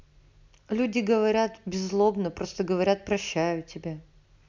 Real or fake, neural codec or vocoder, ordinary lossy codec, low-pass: real; none; MP3, 64 kbps; 7.2 kHz